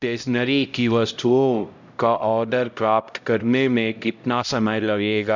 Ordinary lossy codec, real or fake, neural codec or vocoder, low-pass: none; fake; codec, 16 kHz, 0.5 kbps, X-Codec, HuBERT features, trained on LibriSpeech; 7.2 kHz